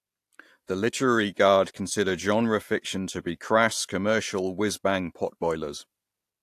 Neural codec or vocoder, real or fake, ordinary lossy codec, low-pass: none; real; AAC, 64 kbps; 14.4 kHz